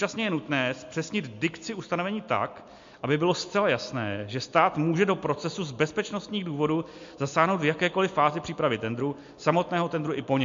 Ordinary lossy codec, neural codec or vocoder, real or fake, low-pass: MP3, 48 kbps; none; real; 7.2 kHz